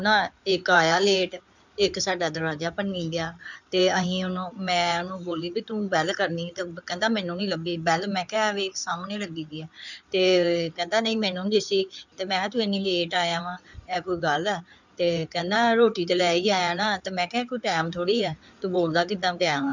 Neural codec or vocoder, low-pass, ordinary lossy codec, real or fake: codec, 16 kHz in and 24 kHz out, 2.2 kbps, FireRedTTS-2 codec; 7.2 kHz; none; fake